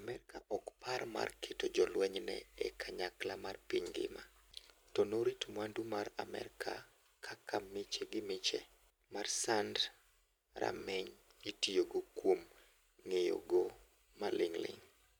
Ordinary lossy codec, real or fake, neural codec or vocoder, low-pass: none; real; none; none